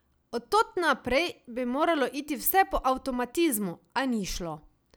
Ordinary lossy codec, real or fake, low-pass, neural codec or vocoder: none; real; none; none